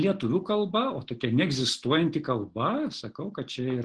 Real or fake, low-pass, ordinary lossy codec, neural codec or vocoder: real; 9.9 kHz; Opus, 16 kbps; none